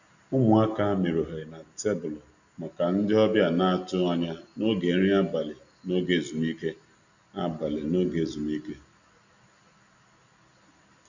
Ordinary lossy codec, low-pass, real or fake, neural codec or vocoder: none; 7.2 kHz; real; none